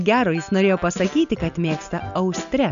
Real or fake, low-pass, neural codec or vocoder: real; 7.2 kHz; none